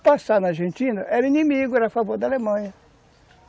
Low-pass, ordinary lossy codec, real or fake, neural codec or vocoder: none; none; real; none